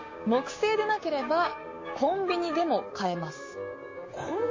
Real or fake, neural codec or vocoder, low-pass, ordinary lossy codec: fake; codec, 16 kHz, 6 kbps, DAC; 7.2 kHz; MP3, 32 kbps